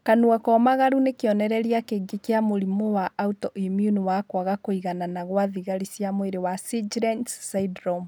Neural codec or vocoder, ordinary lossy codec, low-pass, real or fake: none; none; none; real